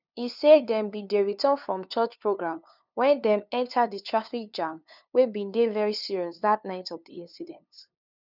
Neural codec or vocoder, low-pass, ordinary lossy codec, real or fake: codec, 16 kHz, 2 kbps, FunCodec, trained on LibriTTS, 25 frames a second; 5.4 kHz; none; fake